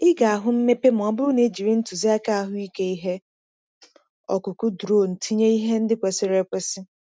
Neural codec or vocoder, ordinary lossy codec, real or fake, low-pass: none; none; real; none